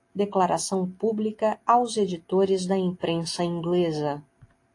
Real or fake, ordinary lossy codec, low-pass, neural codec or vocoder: real; AAC, 48 kbps; 10.8 kHz; none